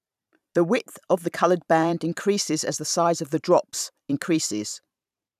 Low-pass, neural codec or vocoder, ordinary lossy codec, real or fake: 14.4 kHz; vocoder, 44.1 kHz, 128 mel bands every 512 samples, BigVGAN v2; none; fake